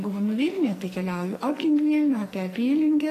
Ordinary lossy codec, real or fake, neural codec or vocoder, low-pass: AAC, 48 kbps; fake; codec, 44.1 kHz, 2.6 kbps, SNAC; 14.4 kHz